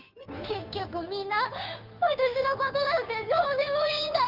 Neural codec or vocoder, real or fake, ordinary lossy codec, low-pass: codec, 16 kHz, 2 kbps, FunCodec, trained on Chinese and English, 25 frames a second; fake; Opus, 24 kbps; 5.4 kHz